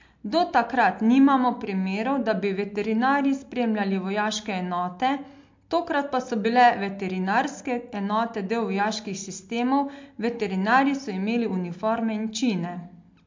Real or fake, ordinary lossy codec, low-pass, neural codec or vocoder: real; MP3, 48 kbps; 7.2 kHz; none